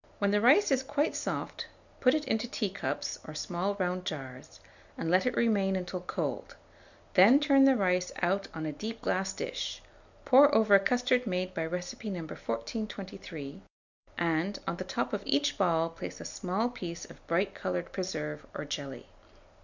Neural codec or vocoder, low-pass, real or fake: none; 7.2 kHz; real